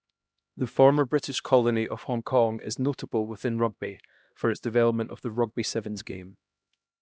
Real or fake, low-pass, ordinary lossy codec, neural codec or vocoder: fake; none; none; codec, 16 kHz, 1 kbps, X-Codec, HuBERT features, trained on LibriSpeech